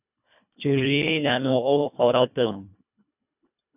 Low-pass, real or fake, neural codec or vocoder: 3.6 kHz; fake; codec, 24 kHz, 1.5 kbps, HILCodec